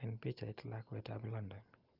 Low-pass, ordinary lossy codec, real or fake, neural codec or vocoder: 5.4 kHz; Opus, 24 kbps; real; none